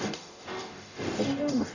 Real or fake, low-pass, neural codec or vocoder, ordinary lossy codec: fake; 7.2 kHz; codec, 44.1 kHz, 0.9 kbps, DAC; none